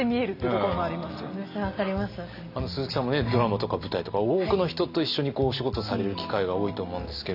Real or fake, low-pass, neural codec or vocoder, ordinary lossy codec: real; 5.4 kHz; none; none